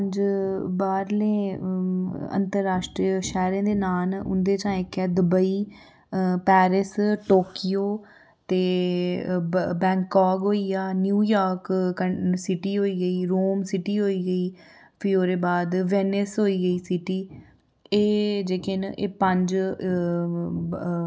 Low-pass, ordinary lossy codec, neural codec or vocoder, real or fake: none; none; none; real